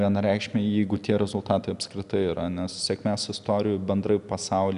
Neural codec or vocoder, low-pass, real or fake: none; 10.8 kHz; real